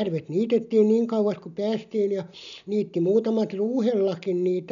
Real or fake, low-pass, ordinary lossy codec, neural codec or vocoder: real; 7.2 kHz; none; none